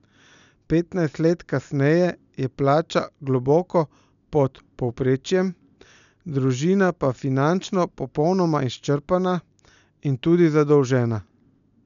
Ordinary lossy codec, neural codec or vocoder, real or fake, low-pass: none; none; real; 7.2 kHz